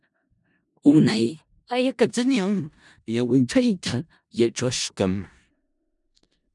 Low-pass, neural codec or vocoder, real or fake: 10.8 kHz; codec, 16 kHz in and 24 kHz out, 0.4 kbps, LongCat-Audio-Codec, four codebook decoder; fake